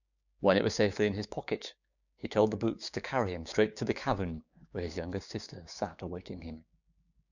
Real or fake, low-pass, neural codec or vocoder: fake; 7.2 kHz; codec, 16 kHz, 6 kbps, DAC